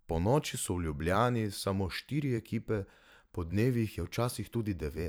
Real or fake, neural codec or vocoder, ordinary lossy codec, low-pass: fake; vocoder, 44.1 kHz, 128 mel bands every 512 samples, BigVGAN v2; none; none